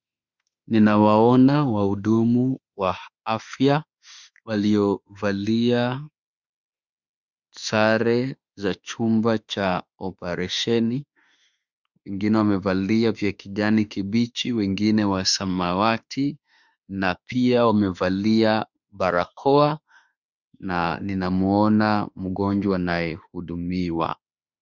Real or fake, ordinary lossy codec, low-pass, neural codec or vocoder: fake; Opus, 64 kbps; 7.2 kHz; autoencoder, 48 kHz, 32 numbers a frame, DAC-VAE, trained on Japanese speech